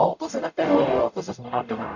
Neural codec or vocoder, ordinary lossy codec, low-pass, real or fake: codec, 44.1 kHz, 0.9 kbps, DAC; none; 7.2 kHz; fake